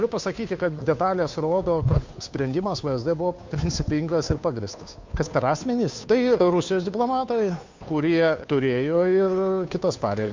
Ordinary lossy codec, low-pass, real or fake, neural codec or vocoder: MP3, 64 kbps; 7.2 kHz; fake; codec, 16 kHz, 2 kbps, FunCodec, trained on Chinese and English, 25 frames a second